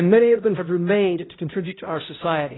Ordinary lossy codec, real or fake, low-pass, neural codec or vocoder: AAC, 16 kbps; fake; 7.2 kHz; codec, 16 kHz, 0.5 kbps, X-Codec, HuBERT features, trained on balanced general audio